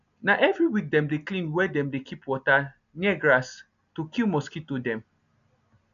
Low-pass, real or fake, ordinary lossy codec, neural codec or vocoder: 7.2 kHz; real; none; none